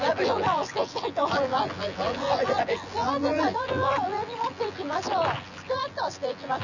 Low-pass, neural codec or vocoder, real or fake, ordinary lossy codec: 7.2 kHz; vocoder, 24 kHz, 100 mel bands, Vocos; fake; none